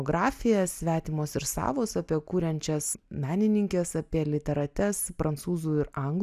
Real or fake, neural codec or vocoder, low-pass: real; none; 14.4 kHz